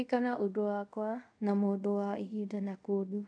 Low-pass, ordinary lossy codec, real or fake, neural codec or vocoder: 9.9 kHz; none; fake; codec, 24 kHz, 0.5 kbps, DualCodec